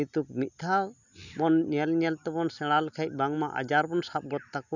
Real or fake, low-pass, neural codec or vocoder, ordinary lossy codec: real; 7.2 kHz; none; none